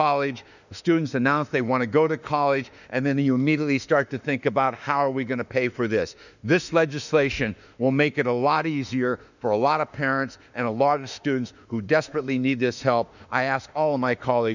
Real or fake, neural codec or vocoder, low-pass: fake; autoencoder, 48 kHz, 32 numbers a frame, DAC-VAE, trained on Japanese speech; 7.2 kHz